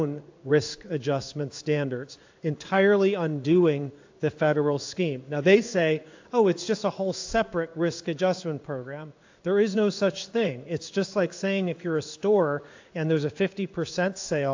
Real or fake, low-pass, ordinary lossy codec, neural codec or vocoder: fake; 7.2 kHz; AAC, 48 kbps; codec, 16 kHz in and 24 kHz out, 1 kbps, XY-Tokenizer